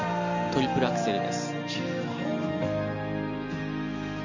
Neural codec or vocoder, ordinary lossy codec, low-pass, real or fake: none; none; 7.2 kHz; real